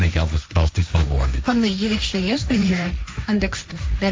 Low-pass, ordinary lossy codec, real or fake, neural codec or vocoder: none; none; fake; codec, 16 kHz, 1.1 kbps, Voila-Tokenizer